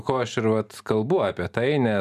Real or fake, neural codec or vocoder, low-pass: real; none; 14.4 kHz